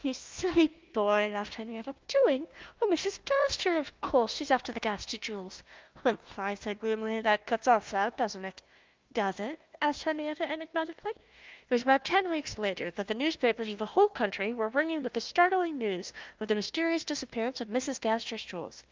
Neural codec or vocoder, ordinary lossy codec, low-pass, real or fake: codec, 16 kHz, 1 kbps, FunCodec, trained on Chinese and English, 50 frames a second; Opus, 24 kbps; 7.2 kHz; fake